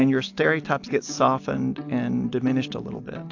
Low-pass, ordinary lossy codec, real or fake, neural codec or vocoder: 7.2 kHz; AAC, 48 kbps; real; none